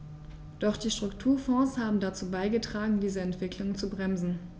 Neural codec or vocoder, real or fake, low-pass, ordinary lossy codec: none; real; none; none